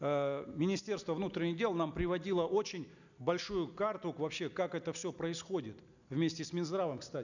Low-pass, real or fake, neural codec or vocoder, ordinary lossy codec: 7.2 kHz; real; none; none